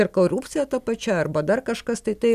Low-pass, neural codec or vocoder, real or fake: 14.4 kHz; none; real